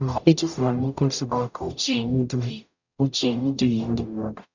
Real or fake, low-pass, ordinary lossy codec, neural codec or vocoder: fake; 7.2 kHz; none; codec, 44.1 kHz, 0.9 kbps, DAC